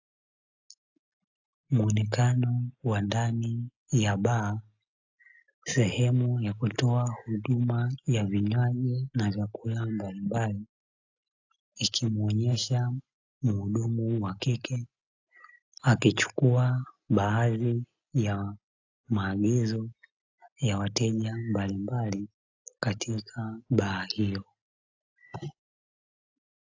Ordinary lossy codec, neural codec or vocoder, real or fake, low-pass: AAC, 48 kbps; none; real; 7.2 kHz